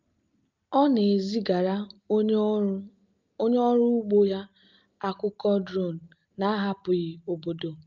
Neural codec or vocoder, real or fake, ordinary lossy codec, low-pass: none; real; Opus, 24 kbps; 7.2 kHz